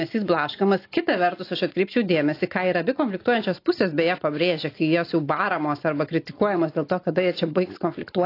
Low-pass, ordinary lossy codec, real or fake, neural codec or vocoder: 5.4 kHz; AAC, 32 kbps; real; none